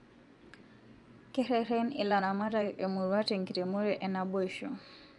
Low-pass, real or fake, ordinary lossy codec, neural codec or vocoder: 10.8 kHz; fake; none; vocoder, 24 kHz, 100 mel bands, Vocos